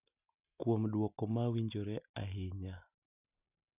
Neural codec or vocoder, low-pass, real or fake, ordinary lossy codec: none; 3.6 kHz; real; none